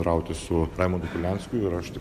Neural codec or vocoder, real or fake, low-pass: none; real; 14.4 kHz